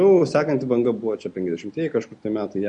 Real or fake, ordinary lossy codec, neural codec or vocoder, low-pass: real; MP3, 48 kbps; none; 10.8 kHz